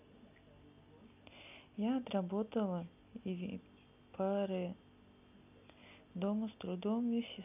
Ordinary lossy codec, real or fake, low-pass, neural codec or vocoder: none; real; 3.6 kHz; none